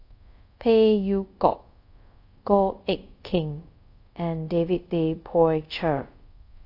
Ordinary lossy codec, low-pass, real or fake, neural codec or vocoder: AAC, 32 kbps; 5.4 kHz; fake; codec, 24 kHz, 0.5 kbps, DualCodec